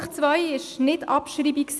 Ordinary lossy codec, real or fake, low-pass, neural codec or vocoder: none; real; none; none